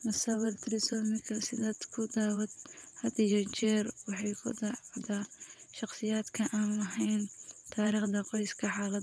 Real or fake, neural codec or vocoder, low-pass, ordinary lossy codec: fake; vocoder, 22.05 kHz, 80 mel bands, WaveNeXt; none; none